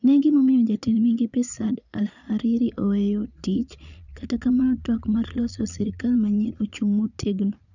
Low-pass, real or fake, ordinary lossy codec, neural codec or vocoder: 7.2 kHz; fake; none; vocoder, 22.05 kHz, 80 mel bands, Vocos